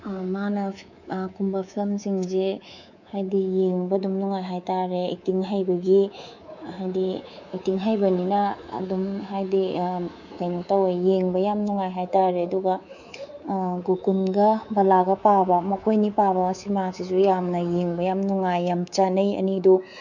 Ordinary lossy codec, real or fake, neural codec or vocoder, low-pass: none; fake; codec, 24 kHz, 3.1 kbps, DualCodec; 7.2 kHz